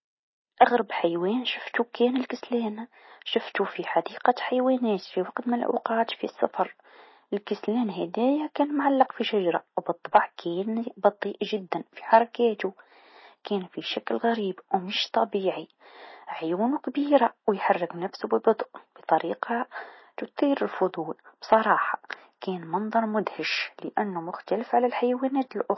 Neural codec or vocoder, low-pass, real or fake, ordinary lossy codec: none; 7.2 kHz; real; MP3, 24 kbps